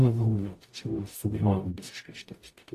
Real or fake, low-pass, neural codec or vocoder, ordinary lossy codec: fake; 14.4 kHz; codec, 44.1 kHz, 0.9 kbps, DAC; AAC, 48 kbps